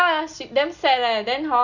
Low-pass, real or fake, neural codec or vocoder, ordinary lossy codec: 7.2 kHz; real; none; none